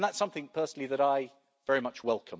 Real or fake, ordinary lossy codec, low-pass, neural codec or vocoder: real; none; none; none